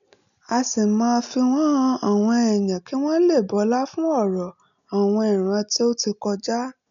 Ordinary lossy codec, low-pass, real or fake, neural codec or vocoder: none; 7.2 kHz; real; none